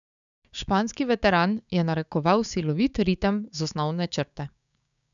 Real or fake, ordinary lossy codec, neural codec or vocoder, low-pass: fake; none; codec, 16 kHz, 6 kbps, DAC; 7.2 kHz